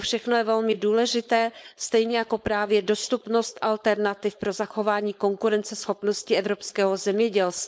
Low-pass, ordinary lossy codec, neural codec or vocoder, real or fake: none; none; codec, 16 kHz, 4.8 kbps, FACodec; fake